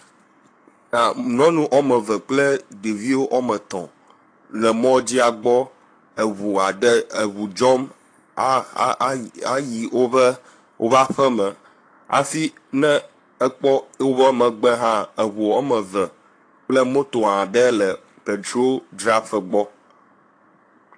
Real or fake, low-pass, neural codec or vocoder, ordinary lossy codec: fake; 9.9 kHz; codec, 44.1 kHz, 7.8 kbps, Pupu-Codec; AAC, 48 kbps